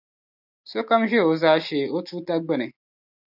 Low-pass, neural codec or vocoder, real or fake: 5.4 kHz; none; real